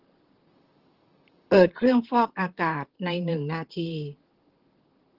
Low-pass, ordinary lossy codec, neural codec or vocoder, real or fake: 5.4 kHz; Opus, 16 kbps; vocoder, 44.1 kHz, 128 mel bands, Pupu-Vocoder; fake